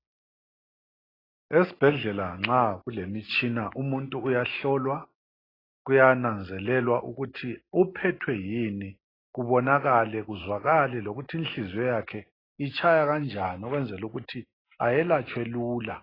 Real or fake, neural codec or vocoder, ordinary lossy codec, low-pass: real; none; AAC, 24 kbps; 5.4 kHz